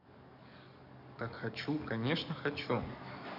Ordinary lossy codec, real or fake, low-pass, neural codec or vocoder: none; fake; 5.4 kHz; codec, 44.1 kHz, 7.8 kbps, DAC